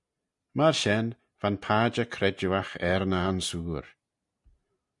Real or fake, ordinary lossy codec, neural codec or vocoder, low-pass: real; MP3, 64 kbps; none; 10.8 kHz